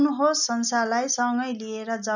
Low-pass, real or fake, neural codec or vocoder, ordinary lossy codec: 7.2 kHz; real; none; none